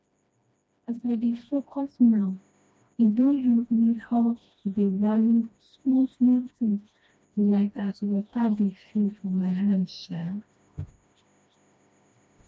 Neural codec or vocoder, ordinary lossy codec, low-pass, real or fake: codec, 16 kHz, 1 kbps, FreqCodec, smaller model; none; none; fake